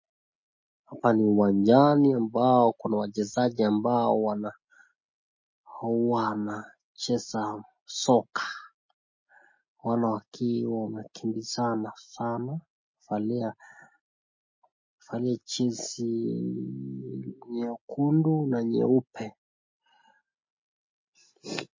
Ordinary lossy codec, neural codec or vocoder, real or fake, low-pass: MP3, 32 kbps; none; real; 7.2 kHz